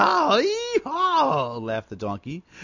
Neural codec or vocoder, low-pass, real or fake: none; 7.2 kHz; real